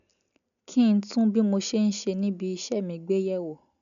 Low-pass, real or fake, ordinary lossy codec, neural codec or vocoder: 7.2 kHz; real; none; none